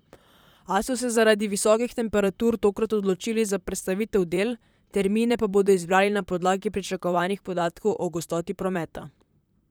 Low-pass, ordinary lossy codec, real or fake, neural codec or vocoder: none; none; fake; vocoder, 44.1 kHz, 128 mel bands, Pupu-Vocoder